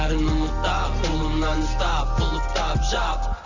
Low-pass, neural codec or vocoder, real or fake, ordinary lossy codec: 7.2 kHz; none; real; none